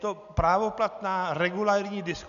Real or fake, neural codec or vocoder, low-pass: real; none; 7.2 kHz